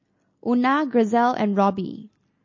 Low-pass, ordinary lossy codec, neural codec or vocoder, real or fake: 7.2 kHz; MP3, 32 kbps; none; real